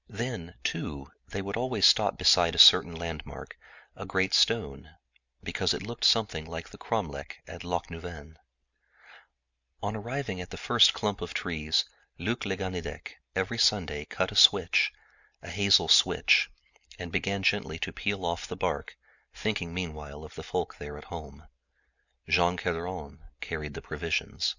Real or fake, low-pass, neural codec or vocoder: real; 7.2 kHz; none